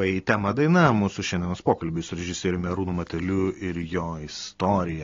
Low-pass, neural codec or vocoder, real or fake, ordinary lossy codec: 7.2 kHz; none; real; AAC, 32 kbps